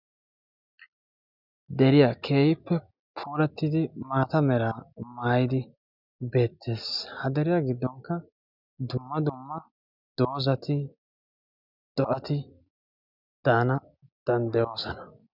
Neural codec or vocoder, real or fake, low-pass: none; real; 5.4 kHz